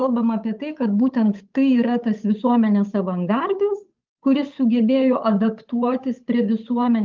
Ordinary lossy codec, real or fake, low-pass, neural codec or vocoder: Opus, 24 kbps; fake; 7.2 kHz; codec, 16 kHz, 8 kbps, FunCodec, trained on Chinese and English, 25 frames a second